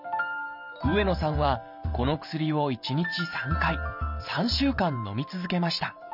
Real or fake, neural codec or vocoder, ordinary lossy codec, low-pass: fake; vocoder, 44.1 kHz, 128 mel bands every 512 samples, BigVGAN v2; none; 5.4 kHz